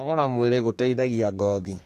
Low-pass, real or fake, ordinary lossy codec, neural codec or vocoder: 14.4 kHz; fake; AAC, 64 kbps; codec, 32 kHz, 1.9 kbps, SNAC